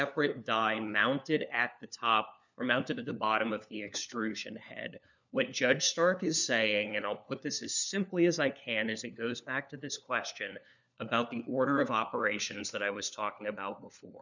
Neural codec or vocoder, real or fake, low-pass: codec, 16 kHz, 4 kbps, FunCodec, trained on LibriTTS, 50 frames a second; fake; 7.2 kHz